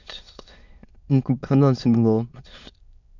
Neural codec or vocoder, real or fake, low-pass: autoencoder, 22.05 kHz, a latent of 192 numbers a frame, VITS, trained on many speakers; fake; 7.2 kHz